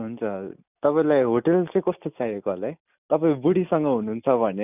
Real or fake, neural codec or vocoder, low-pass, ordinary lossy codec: real; none; 3.6 kHz; none